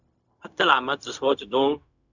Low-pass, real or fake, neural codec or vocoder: 7.2 kHz; fake; codec, 16 kHz, 0.4 kbps, LongCat-Audio-Codec